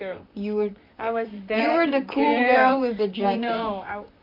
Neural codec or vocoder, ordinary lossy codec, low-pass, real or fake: vocoder, 44.1 kHz, 128 mel bands, Pupu-Vocoder; AAC, 48 kbps; 5.4 kHz; fake